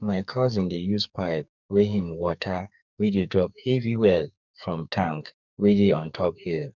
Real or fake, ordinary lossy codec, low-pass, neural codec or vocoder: fake; none; 7.2 kHz; codec, 44.1 kHz, 2.6 kbps, SNAC